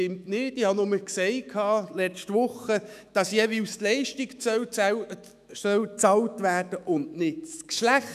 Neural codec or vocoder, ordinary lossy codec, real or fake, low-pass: autoencoder, 48 kHz, 128 numbers a frame, DAC-VAE, trained on Japanese speech; none; fake; 14.4 kHz